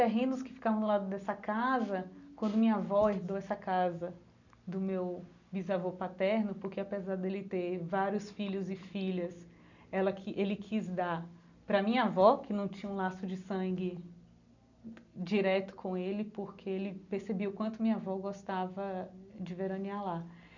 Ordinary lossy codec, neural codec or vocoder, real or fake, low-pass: none; none; real; 7.2 kHz